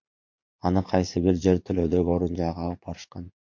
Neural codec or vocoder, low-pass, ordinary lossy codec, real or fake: vocoder, 44.1 kHz, 80 mel bands, Vocos; 7.2 kHz; MP3, 48 kbps; fake